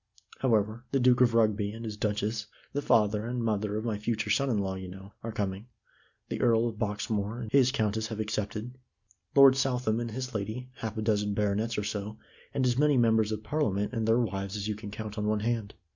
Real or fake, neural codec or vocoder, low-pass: real; none; 7.2 kHz